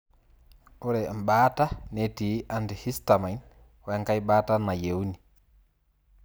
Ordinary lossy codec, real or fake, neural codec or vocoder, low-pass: none; real; none; none